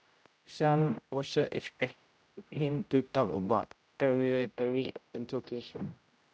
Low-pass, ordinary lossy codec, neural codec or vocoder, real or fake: none; none; codec, 16 kHz, 0.5 kbps, X-Codec, HuBERT features, trained on general audio; fake